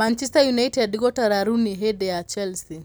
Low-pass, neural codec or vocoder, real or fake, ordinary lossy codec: none; none; real; none